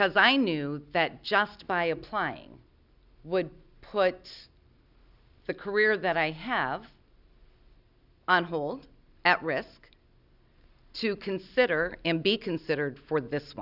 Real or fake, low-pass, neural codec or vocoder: real; 5.4 kHz; none